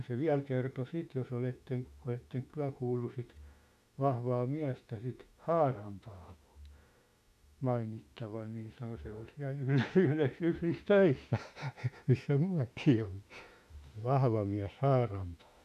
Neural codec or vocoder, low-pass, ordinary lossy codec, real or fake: autoencoder, 48 kHz, 32 numbers a frame, DAC-VAE, trained on Japanese speech; 14.4 kHz; none; fake